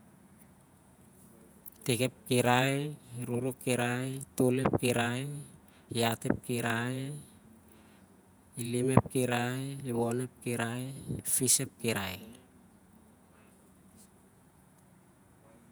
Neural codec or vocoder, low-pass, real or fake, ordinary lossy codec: vocoder, 48 kHz, 128 mel bands, Vocos; none; fake; none